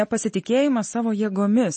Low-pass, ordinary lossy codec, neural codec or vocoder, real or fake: 10.8 kHz; MP3, 32 kbps; none; real